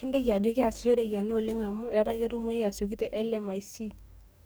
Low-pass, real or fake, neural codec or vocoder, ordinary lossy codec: none; fake; codec, 44.1 kHz, 2.6 kbps, DAC; none